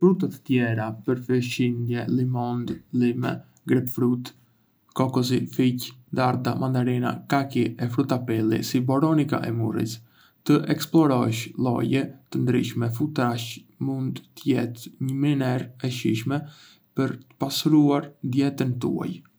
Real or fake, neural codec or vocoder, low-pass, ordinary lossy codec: real; none; none; none